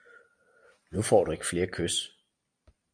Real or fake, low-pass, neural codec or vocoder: real; 9.9 kHz; none